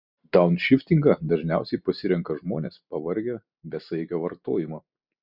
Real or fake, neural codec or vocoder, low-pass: real; none; 5.4 kHz